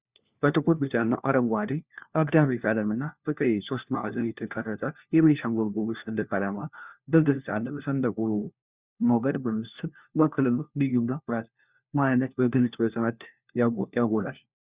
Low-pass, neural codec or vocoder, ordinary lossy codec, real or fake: 3.6 kHz; codec, 16 kHz, 1 kbps, FunCodec, trained on LibriTTS, 50 frames a second; Opus, 64 kbps; fake